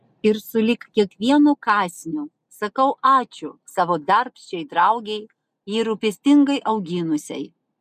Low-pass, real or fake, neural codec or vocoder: 14.4 kHz; real; none